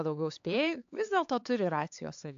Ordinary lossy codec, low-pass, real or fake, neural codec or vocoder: AAC, 64 kbps; 7.2 kHz; fake; codec, 16 kHz, 4 kbps, X-Codec, HuBERT features, trained on LibriSpeech